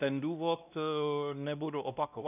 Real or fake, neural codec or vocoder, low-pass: fake; codec, 16 kHz, 1 kbps, X-Codec, WavLM features, trained on Multilingual LibriSpeech; 3.6 kHz